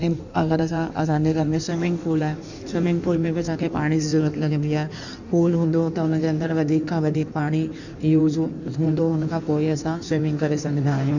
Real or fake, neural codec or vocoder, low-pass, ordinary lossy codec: fake; codec, 16 kHz in and 24 kHz out, 1.1 kbps, FireRedTTS-2 codec; 7.2 kHz; none